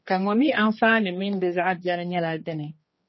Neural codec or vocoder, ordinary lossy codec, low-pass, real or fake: codec, 16 kHz, 2 kbps, X-Codec, HuBERT features, trained on general audio; MP3, 24 kbps; 7.2 kHz; fake